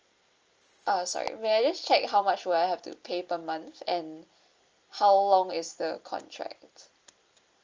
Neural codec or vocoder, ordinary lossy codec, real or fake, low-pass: none; Opus, 24 kbps; real; 7.2 kHz